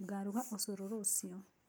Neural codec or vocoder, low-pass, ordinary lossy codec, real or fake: none; none; none; real